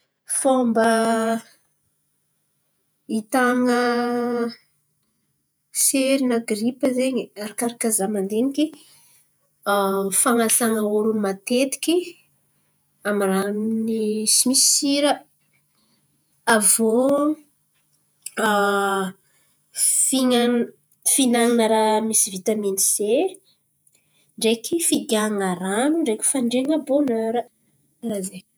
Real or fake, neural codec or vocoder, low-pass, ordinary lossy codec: fake; vocoder, 48 kHz, 128 mel bands, Vocos; none; none